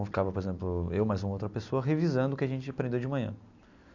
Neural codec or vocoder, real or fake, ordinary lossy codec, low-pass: none; real; none; 7.2 kHz